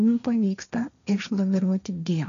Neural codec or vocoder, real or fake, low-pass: codec, 16 kHz, 1.1 kbps, Voila-Tokenizer; fake; 7.2 kHz